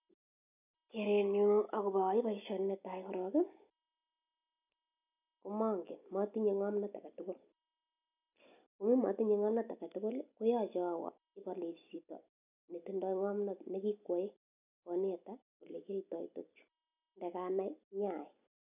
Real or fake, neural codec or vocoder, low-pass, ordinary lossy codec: real; none; 3.6 kHz; none